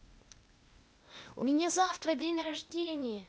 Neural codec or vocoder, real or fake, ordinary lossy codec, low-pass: codec, 16 kHz, 0.8 kbps, ZipCodec; fake; none; none